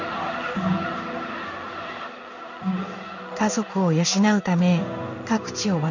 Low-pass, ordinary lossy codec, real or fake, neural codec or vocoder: 7.2 kHz; none; fake; codec, 16 kHz in and 24 kHz out, 1 kbps, XY-Tokenizer